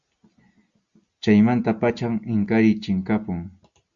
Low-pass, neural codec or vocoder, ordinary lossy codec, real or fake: 7.2 kHz; none; Opus, 64 kbps; real